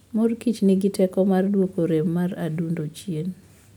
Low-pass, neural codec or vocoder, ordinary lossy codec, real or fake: 19.8 kHz; none; none; real